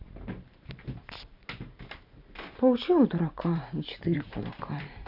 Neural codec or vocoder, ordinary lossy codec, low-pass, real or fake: vocoder, 44.1 kHz, 128 mel bands every 512 samples, BigVGAN v2; none; 5.4 kHz; fake